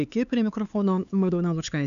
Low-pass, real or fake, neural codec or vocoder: 7.2 kHz; fake; codec, 16 kHz, 4 kbps, X-Codec, HuBERT features, trained on LibriSpeech